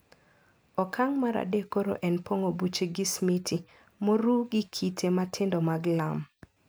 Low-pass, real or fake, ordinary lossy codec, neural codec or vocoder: none; real; none; none